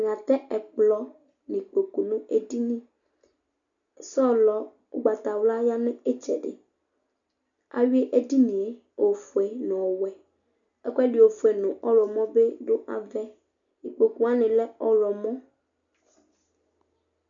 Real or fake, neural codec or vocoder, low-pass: real; none; 7.2 kHz